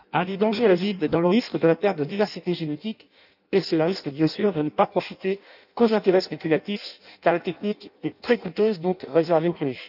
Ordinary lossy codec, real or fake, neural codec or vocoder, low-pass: none; fake; codec, 16 kHz in and 24 kHz out, 0.6 kbps, FireRedTTS-2 codec; 5.4 kHz